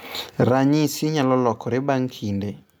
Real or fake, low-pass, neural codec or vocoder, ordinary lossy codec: real; none; none; none